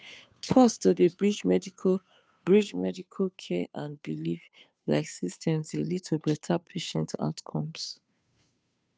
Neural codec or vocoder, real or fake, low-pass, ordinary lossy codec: codec, 16 kHz, 2 kbps, FunCodec, trained on Chinese and English, 25 frames a second; fake; none; none